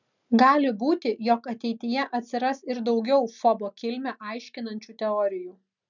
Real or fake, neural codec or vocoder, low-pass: real; none; 7.2 kHz